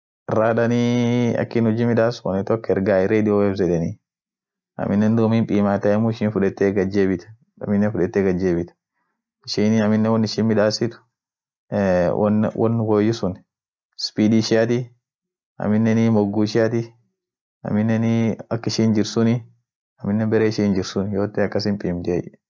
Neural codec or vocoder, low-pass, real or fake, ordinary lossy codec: none; none; real; none